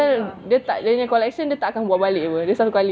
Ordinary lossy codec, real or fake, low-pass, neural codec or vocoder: none; real; none; none